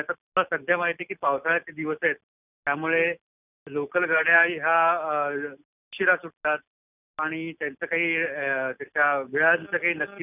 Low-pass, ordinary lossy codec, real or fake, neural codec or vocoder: 3.6 kHz; none; real; none